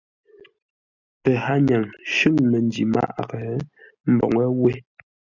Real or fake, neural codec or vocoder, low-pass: real; none; 7.2 kHz